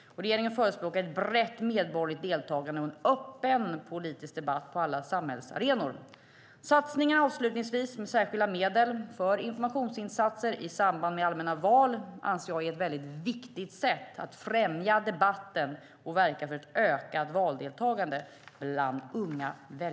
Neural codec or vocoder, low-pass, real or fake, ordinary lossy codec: none; none; real; none